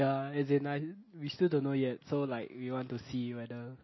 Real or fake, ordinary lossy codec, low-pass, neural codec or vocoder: real; MP3, 24 kbps; 7.2 kHz; none